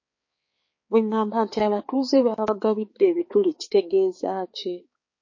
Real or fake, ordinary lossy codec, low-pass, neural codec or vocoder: fake; MP3, 32 kbps; 7.2 kHz; codec, 16 kHz, 4 kbps, X-Codec, HuBERT features, trained on balanced general audio